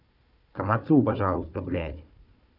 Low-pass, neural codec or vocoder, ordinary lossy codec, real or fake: 5.4 kHz; codec, 16 kHz, 4 kbps, FunCodec, trained on Chinese and English, 50 frames a second; none; fake